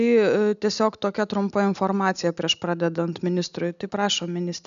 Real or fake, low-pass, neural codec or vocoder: real; 7.2 kHz; none